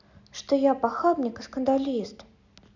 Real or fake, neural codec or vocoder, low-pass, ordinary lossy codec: real; none; 7.2 kHz; none